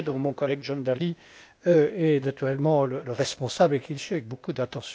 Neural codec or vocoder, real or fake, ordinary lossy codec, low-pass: codec, 16 kHz, 0.8 kbps, ZipCodec; fake; none; none